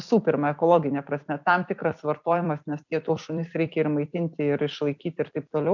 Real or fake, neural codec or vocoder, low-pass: fake; vocoder, 44.1 kHz, 128 mel bands every 256 samples, BigVGAN v2; 7.2 kHz